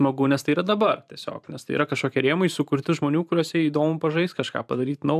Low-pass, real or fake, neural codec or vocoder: 14.4 kHz; fake; vocoder, 44.1 kHz, 128 mel bands every 512 samples, BigVGAN v2